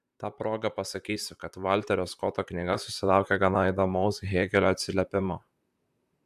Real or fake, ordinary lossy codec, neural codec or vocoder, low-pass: fake; AAC, 96 kbps; vocoder, 44.1 kHz, 128 mel bands, Pupu-Vocoder; 14.4 kHz